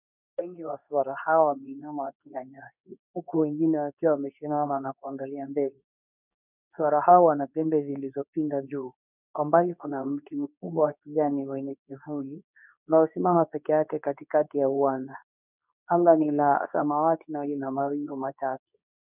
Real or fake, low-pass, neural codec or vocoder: fake; 3.6 kHz; codec, 24 kHz, 0.9 kbps, WavTokenizer, medium speech release version 2